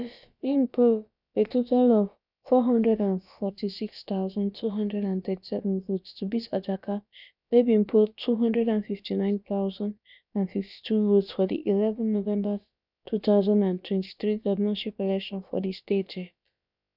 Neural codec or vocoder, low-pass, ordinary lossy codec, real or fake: codec, 16 kHz, about 1 kbps, DyCAST, with the encoder's durations; 5.4 kHz; none; fake